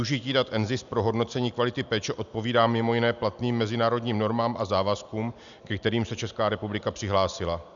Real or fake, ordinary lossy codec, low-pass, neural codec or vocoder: real; MP3, 96 kbps; 7.2 kHz; none